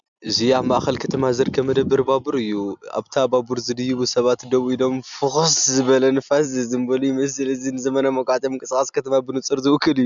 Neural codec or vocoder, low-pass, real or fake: none; 7.2 kHz; real